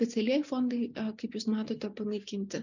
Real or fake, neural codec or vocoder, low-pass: real; none; 7.2 kHz